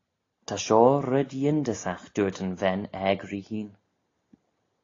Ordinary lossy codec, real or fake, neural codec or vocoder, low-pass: AAC, 32 kbps; real; none; 7.2 kHz